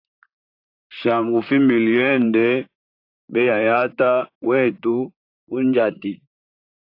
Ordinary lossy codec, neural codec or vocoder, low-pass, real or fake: AAC, 32 kbps; vocoder, 44.1 kHz, 128 mel bands, Pupu-Vocoder; 5.4 kHz; fake